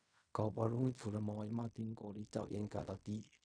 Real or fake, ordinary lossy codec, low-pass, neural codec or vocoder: fake; none; 9.9 kHz; codec, 16 kHz in and 24 kHz out, 0.4 kbps, LongCat-Audio-Codec, fine tuned four codebook decoder